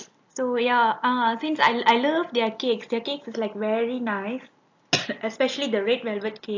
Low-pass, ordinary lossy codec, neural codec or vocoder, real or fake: 7.2 kHz; AAC, 48 kbps; none; real